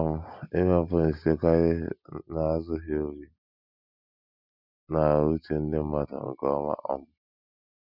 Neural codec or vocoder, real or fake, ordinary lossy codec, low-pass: none; real; none; 5.4 kHz